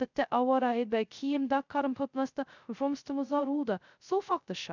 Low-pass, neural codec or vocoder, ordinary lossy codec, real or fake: 7.2 kHz; codec, 16 kHz, 0.2 kbps, FocalCodec; none; fake